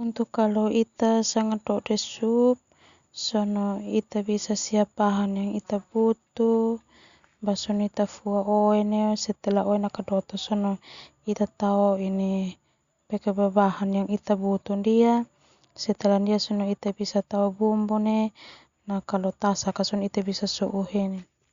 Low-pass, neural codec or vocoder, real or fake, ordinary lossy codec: 7.2 kHz; none; real; Opus, 64 kbps